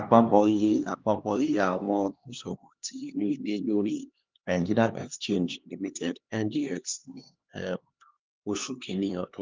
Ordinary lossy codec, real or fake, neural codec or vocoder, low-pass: Opus, 32 kbps; fake; codec, 16 kHz, 2 kbps, X-Codec, HuBERT features, trained on LibriSpeech; 7.2 kHz